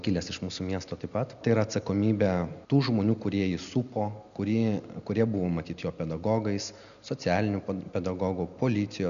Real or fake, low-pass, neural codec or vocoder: real; 7.2 kHz; none